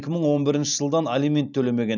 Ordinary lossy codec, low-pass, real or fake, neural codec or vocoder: none; 7.2 kHz; real; none